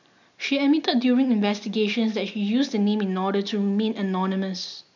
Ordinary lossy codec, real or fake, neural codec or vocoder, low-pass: none; real; none; 7.2 kHz